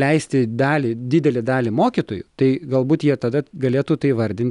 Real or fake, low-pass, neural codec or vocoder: real; 10.8 kHz; none